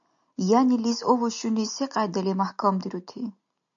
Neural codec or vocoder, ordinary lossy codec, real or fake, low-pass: none; MP3, 96 kbps; real; 7.2 kHz